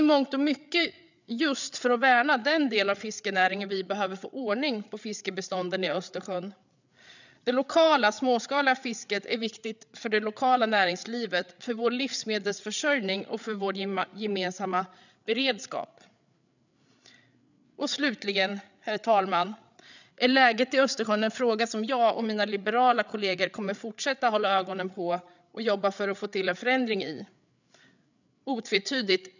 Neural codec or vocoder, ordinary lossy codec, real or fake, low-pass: codec, 16 kHz, 8 kbps, FreqCodec, larger model; none; fake; 7.2 kHz